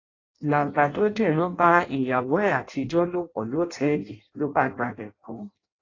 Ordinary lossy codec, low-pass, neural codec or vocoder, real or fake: AAC, 32 kbps; 7.2 kHz; codec, 16 kHz in and 24 kHz out, 0.6 kbps, FireRedTTS-2 codec; fake